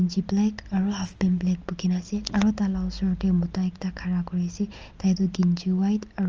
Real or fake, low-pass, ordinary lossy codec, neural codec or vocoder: real; 7.2 kHz; Opus, 24 kbps; none